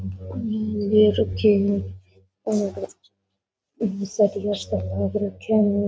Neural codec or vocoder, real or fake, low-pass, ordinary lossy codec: none; real; none; none